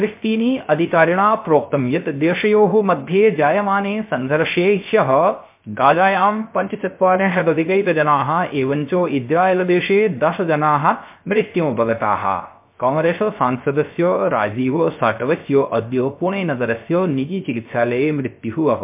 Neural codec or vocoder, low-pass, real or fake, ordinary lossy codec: codec, 16 kHz, 0.3 kbps, FocalCodec; 3.6 kHz; fake; MP3, 32 kbps